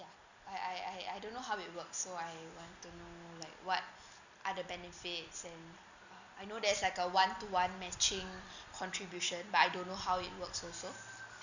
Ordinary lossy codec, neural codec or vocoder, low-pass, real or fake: none; none; 7.2 kHz; real